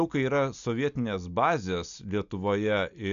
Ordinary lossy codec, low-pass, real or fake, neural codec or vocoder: AAC, 96 kbps; 7.2 kHz; real; none